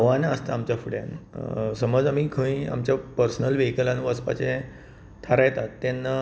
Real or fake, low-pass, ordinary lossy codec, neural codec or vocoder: real; none; none; none